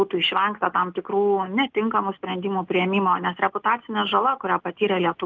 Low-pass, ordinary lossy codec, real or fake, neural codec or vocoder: 7.2 kHz; Opus, 24 kbps; real; none